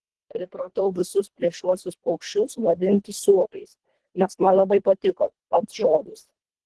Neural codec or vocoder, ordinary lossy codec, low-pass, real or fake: codec, 24 kHz, 1.5 kbps, HILCodec; Opus, 16 kbps; 10.8 kHz; fake